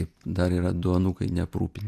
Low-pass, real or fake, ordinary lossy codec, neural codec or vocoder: 14.4 kHz; real; AAC, 64 kbps; none